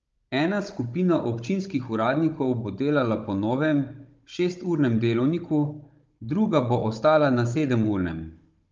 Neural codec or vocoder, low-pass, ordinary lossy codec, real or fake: codec, 16 kHz, 16 kbps, FunCodec, trained on Chinese and English, 50 frames a second; 7.2 kHz; Opus, 24 kbps; fake